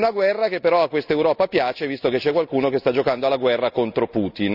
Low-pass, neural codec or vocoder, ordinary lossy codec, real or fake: 5.4 kHz; none; none; real